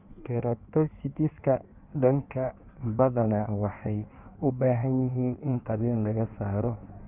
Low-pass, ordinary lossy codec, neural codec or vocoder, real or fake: 3.6 kHz; none; codec, 16 kHz in and 24 kHz out, 1.1 kbps, FireRedTTS-2 codec; fake